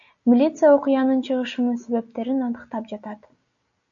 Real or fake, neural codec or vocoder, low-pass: real; none; 7.2 kHz